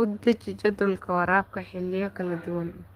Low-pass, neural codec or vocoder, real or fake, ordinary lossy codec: 14.4 kHz; codec, 32 kHz, 1.9 kbps, SNAC; fake; Opus, 24 kbps